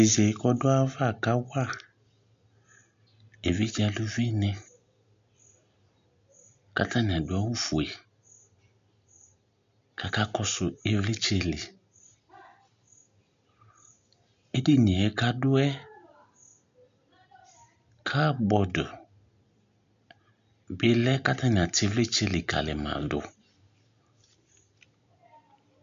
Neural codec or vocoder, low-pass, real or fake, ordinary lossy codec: none; 7.2 kHz; real; MP3, 64 kbps